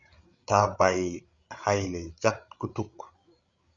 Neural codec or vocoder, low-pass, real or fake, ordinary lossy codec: codec, 16 kHz, 8 kbps, FreqCodec, larger model; 7.2 kHz; fake; Opus, 64 kbps